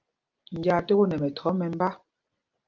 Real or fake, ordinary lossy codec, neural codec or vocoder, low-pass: real; Opus, 24 kbps; none; 7.2 kHz